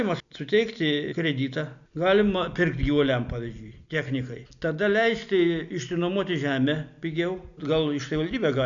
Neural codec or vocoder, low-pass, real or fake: none; 7.2 kHz; real